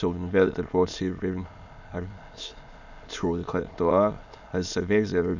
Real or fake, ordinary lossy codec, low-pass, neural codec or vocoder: fake; none; 7.2 kHz; autoencoder, 22.05 kHz, a latent of 192 numbers a frame, VITS, trained on many speakers